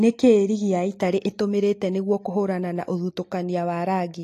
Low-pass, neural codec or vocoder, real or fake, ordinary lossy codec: 14.4 kHz; none; real; AAC, 48 kbps